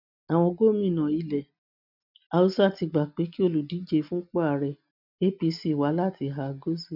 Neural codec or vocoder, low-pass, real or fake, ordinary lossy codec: none; 5.4 kHz; real; none